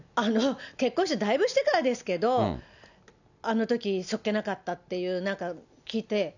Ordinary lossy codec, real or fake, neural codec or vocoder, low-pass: none; real; none; 7.2 kHz